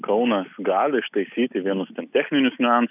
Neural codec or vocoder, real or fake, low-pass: none; real; 3.6 kHz